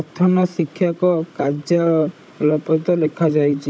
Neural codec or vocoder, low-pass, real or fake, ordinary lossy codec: codec, 16 kHz, 16 kbps, FunCodec, trained on Chinese and English, 50 frames a second; none; fake; none